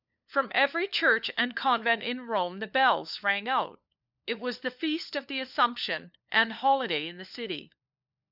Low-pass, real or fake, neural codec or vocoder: 5.4 kHz; fake; codec, 16 kHz, 4 kbps, FunCodec, trained on LibriTTS, 50 frames a second